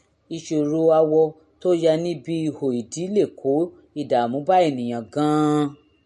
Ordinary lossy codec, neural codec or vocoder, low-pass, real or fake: MP3, 48 kbps; none; 14.4 kHz; real